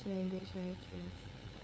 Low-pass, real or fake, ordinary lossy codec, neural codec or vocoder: none; fake; none; codec, 16 kHz, 8 kbps, FunCodec, trained on LibriTTS, 25 frames a second